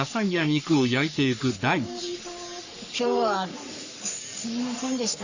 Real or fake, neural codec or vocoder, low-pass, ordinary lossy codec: fake; codec, 44.1 kHz, 3.4 kbps, Pupu-Codec; 7.2 kHz; Opus, 64 kbps